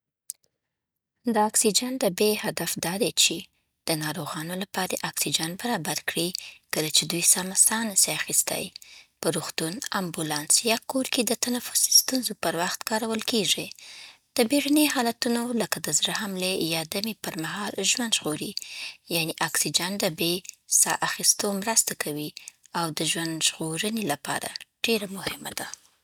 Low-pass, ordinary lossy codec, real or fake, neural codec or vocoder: none; none; real; none